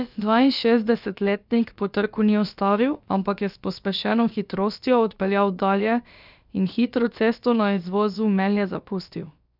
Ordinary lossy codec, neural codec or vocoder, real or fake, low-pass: none; codec, 16 kHz, about 1 kbps, DyCAST, with the encoder's durations; fake; 5.4 kHz